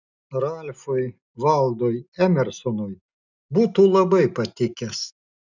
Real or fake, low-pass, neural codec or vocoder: real; 7.2 kHz; none